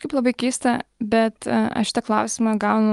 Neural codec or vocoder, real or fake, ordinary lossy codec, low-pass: none; real; Opus, 32 kbps; 10.8 kHz